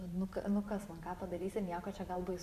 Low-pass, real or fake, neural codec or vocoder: 14.4 kHz; fake; vocoder, 44.1 kHz, 128 mel bands every 512 samples, BigVGAN v2